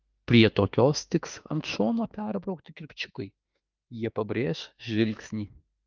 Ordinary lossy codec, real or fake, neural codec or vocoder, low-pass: Opus, 32 kbps; fake; autoencoder, 48 kHz, 32 numbers a frame, DAC-VAE, trained on Japanese speech; 7.2 kHz